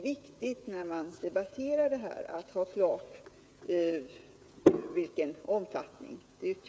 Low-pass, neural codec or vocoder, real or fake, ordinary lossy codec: none; codec, 16 kHz, 16 kbps, FreqCodec, smaller model; fake; none